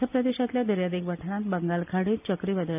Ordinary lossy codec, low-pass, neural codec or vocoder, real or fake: none; 3.6 kHz; none; real